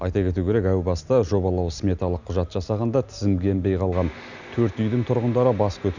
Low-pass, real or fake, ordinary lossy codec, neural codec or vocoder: 7.2 kHz; real; none; none